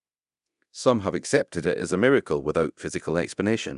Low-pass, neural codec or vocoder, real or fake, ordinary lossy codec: 10.8 kHz; codec, 24 kHz, 0.9 kbps, DualCodec; fake; none